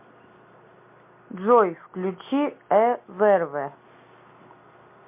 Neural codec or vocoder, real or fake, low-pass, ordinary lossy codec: none; real; 3.6 kHz; MP3, 24 kbps